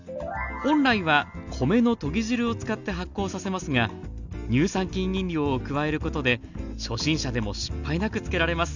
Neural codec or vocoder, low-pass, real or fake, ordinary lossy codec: none; 7.2 kHz; real; none